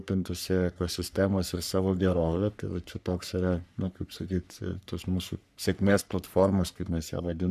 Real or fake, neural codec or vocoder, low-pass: fake; codec, 44.1 kHz, 3.4 kbps, Pupu-Codec; 14.4 kHz